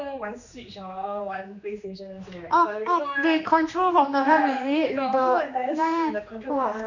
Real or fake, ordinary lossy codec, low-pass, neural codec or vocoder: fake; none; 7.2 kHz; codec, 16 kHz, 2 kbps, X-Codec, HuBERT features, trained on general audio